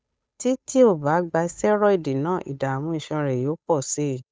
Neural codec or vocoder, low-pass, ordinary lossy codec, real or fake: codec, 16 kHz, 8 kbps, FunCodec, trained on Chinese and English, 25 frames a second; none; none; fake